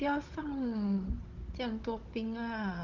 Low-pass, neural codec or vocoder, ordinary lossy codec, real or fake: 7.2 kHz; codec, 16 kHz, 16 kbps, FunCodec, trained on Chinese and English, 50 frames a second; Opus, 16 kbps; fake